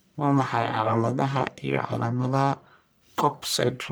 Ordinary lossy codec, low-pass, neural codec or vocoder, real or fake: none; none; codec, 44.1 kHz, 1.7 kbps, Pupu-Codec; fake